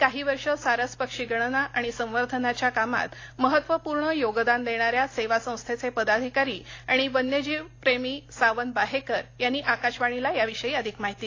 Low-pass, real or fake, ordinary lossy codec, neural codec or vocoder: 7.2 kHz; real; AAC, 32 kbps; none